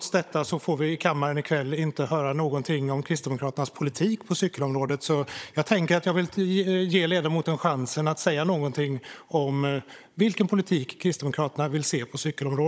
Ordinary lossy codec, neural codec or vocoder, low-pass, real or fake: none; codec, 16 kHz, 16 kbps, FunCodec, trained on Chinese and English, 50 frames a second; none; fake